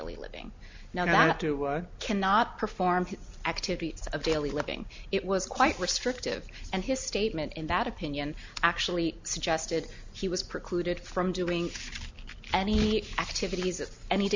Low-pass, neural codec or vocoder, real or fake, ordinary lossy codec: 7.2 kHz; none; real; MP3, 64 kbps